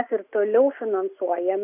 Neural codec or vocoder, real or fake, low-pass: none; real; 3.6 kHz